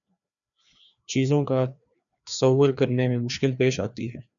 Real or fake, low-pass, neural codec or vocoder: fake; 7.2 kHz; codec, 16 kHz, 2 kbps, FreqCodec, larger model